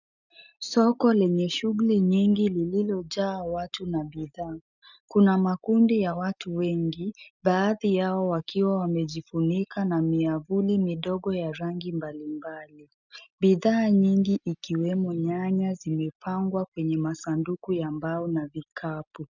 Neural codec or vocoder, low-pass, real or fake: none; 7.2 kHz; real